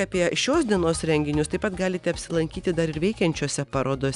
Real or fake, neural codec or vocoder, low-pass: real; none; 10.8 kHz